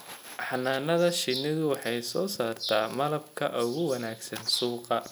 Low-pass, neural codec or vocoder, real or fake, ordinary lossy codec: none; none; real; none